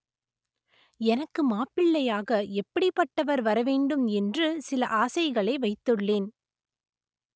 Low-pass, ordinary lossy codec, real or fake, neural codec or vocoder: none; none; real; none